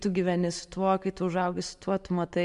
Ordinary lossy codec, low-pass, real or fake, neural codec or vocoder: MP3, 64 kbps; 10.8 kHz; real; none